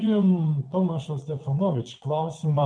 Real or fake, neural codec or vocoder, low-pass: fake; vocoder, 22.05 kHz, 80 mel bands, WaveNeXt; 9.9 kHz